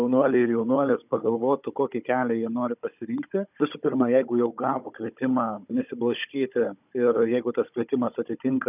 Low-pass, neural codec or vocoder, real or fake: 3.6 kHz; codec, 16 kHz, 16 kbps, FunCodec, trained on Chinese and English, 50 frames a second; fake